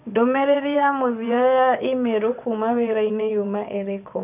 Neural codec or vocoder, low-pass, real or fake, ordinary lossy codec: vocoder, 22.05 kHz, 80 mel bands, WaveNeXt; 3.6 kHz; fake; none